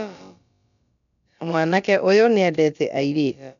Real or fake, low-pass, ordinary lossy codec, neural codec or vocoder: fake; 7.2 kHz; none; codec, 16 kHz, about 1 kbps, DyCAST, with the encoder's durations